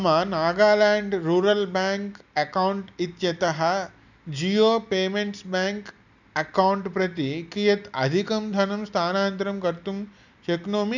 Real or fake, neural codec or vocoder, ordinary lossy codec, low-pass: real; none; none; 7.2 kHz